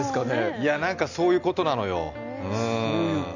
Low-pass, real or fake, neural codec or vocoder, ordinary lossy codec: 7.2 kHz; real; none; none